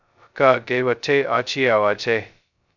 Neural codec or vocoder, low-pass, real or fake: codec, 16 kHz, 0.2 kbps, FocalCodec; 7.2 kHz; fake